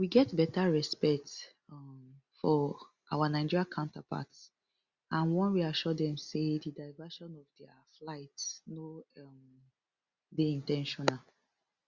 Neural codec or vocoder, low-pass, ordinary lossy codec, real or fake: none; 7.2 kHz; Opus, 64 kbps; real